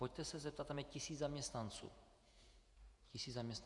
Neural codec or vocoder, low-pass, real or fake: vocoder, 48 kHz, 128 mel bands, Vocos; 10.8 kHz; fake